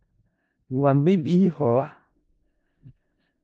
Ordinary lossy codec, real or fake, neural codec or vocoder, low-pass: Opus, 24 kbps; fake; codec, 16 kHz in and 24 kHz out, 0.4 kbps, LongCat-Audio-Codec, four codebook decoder; 10.8 kHz